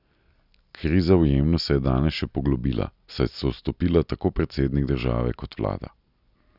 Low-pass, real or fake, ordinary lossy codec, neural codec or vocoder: 5.4 kHz; real; none; none